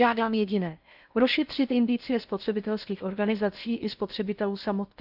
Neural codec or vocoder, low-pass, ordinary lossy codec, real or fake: codec, 16 kHz in and 24 kHz out, 0.6 kbps, FocalCodec, streaming, 4096 codes; 5.4 kHz; none; fake